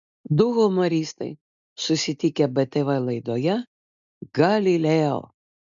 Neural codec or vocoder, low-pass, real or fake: none; 7.2 kHz; real